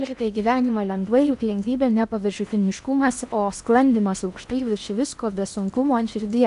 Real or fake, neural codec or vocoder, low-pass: fake; codec, 16 kHz in and 24 kHz out, 0.8 kbps, FocalCodec, streaming, 65536 codes; 10.8 kHz